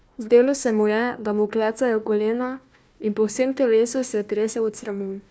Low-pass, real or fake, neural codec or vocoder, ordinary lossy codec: none; fake; codec, 16 kHz, 1 kbps, FunCodec, trained on Chinese and English, 50 frames a second; none